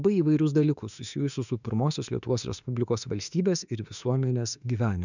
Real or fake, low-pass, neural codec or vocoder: fake; 7.2 kHz; autoencoder, 48 kHz, 32 numbers a frame, DAC-VAE, trained on Japanese speech